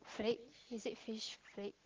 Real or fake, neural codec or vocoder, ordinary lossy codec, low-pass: real; none; Opus, 16 kbps; 7.2 kHz